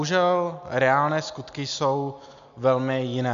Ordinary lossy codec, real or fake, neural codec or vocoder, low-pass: MP3, 64 kbps; real; none; 7.2 kHz